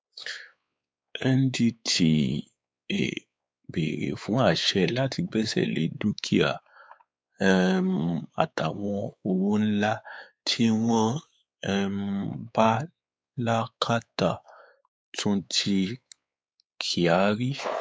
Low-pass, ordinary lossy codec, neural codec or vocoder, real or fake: none; none; codec, 16 kHz, 4 kbps, X-Codec, WavLM features, trained on Multilingual LibriSpeech; fake